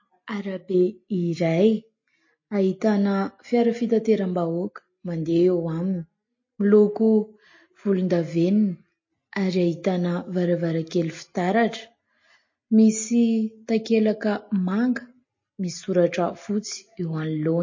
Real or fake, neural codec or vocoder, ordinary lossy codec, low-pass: real; none; MP3, 32 kbps; 7.2 kHz